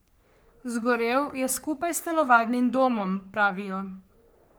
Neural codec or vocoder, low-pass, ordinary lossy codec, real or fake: codec, 44.1 kHz, 3.4 kbps, Pupu-Codec; none; none; fake